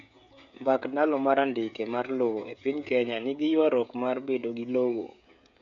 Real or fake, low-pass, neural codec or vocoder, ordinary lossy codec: fake; 7.2 kHz; codec, 16 kHz, 16 kbps, FreqCodec, smaller model; none